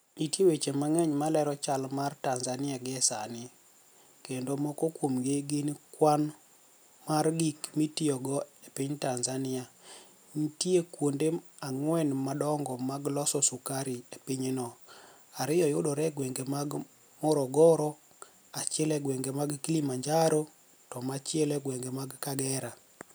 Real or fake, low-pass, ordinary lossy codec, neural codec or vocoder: real; none; none; none